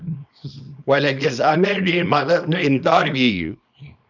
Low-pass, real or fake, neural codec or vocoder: 7.2 kHz; fake; codec, 24 kHz, 0.9 kbps, WavTokenizer, small release